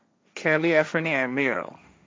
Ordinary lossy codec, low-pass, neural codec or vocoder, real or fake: none; none; codec, 16 kHz, 1.1 kbps, Voila-Tokenizer; fake